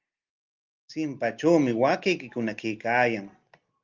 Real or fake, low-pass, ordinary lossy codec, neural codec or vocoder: real; 7.2 kHz; Opus, 24 kbps; none